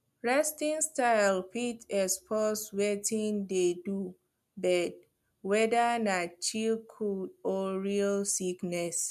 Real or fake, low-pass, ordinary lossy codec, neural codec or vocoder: real; 14.4 kHz; MP3, 96 kbps; none